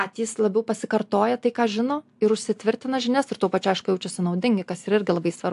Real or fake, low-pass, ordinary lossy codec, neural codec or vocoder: real; 10.8 kHz; AAC, 64 kbps; none